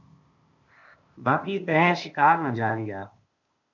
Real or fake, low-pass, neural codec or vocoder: fake; 7.2 kHz; codec, 16 kHz, 0.8 kbps, ZipCodec